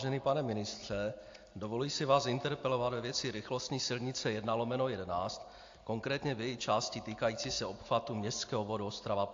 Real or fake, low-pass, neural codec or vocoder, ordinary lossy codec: real; 7.2 kHz; none; AAC, 48 kbps